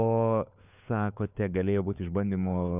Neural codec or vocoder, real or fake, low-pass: codec, 16 kHz, 4 kbps, FunCodec, trained on LibriTTS, 50 frames a second; fake; 3.6 kHz